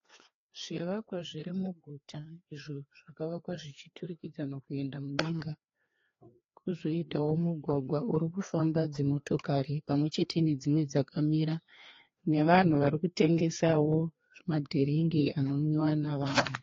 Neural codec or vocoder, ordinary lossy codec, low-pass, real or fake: codec, 16 kHz, 2 kbps, FreqCodec, larger model; AAC, 32 kbps; 7.2 kHz; fake